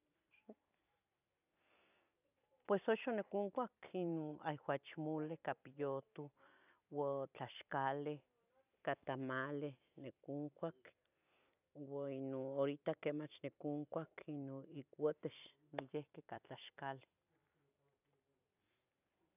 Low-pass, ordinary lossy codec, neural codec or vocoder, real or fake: 3.6 kHz; none; vocoder, 44.1 kHz, 128 mel bands every 256 samples, BigVGAN v2; fake